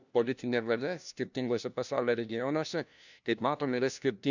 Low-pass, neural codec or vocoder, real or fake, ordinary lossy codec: 7.2 kHz; codec, 16 kHz, 1 kbps, FunCodec, trained on LibriTTS, 50 frames a second; fake; none